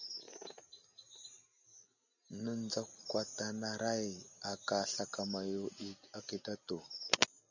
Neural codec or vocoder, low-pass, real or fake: none; 7.2 kHz; real